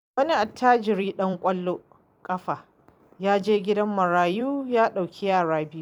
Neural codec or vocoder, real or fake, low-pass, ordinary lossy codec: vocoder, 44.1 kHz, 128 mel bands every 256 samples, BigVGAN v2; fake; 19.8 kHz; none